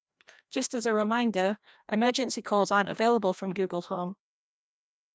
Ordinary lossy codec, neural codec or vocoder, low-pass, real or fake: none; codec, 16 kHz, 1 kbps, FreqCodec, larger model; none; fake